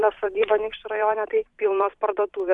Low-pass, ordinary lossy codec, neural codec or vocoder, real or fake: 10.8 kHz; MP3, 64 kbps; vocoder, 44.1 kHz, 128 mel bands every 256 samples, BigVGAN v2; fake